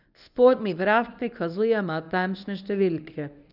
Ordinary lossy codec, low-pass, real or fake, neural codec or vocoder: none; 5.4 kHz; fake; codec, 24 kHz, 0.9 kbps, WavTokenizer, medium speech release version 1